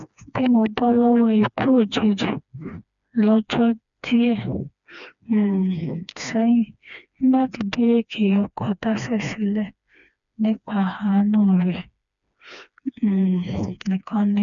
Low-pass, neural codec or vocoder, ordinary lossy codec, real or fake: 7.2 kHz; codec, 16 kHz, 2 kbps, FreqCodec, smaller model; none; fake